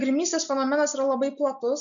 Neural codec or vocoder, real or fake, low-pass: none; real; 7.2 kHz